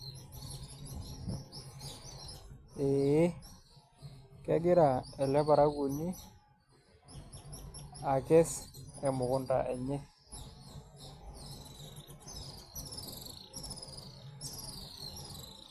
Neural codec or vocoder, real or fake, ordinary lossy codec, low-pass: none; real; AAC, 64 kbps; 14.4 kHz